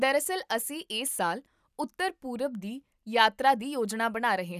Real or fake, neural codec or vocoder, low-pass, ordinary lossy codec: real; none; 14.4 kHz; none